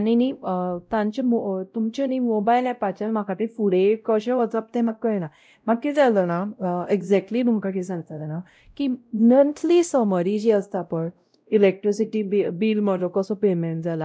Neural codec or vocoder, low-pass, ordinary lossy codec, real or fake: codec, 16 kHz, 0.5 kbps, X-Codec, WavLM features, trained on Multilingual LibriSpeech; none; none; fake